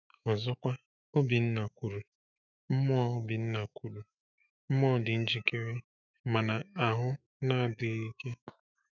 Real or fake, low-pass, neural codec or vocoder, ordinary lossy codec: fake; 7.2 kHz; autoencoder, 48 kHz, 128 numbers a frame, DAC-VAE, trained on Japanese speech; none